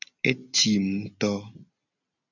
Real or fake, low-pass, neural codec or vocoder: real; 7.2 kHz; none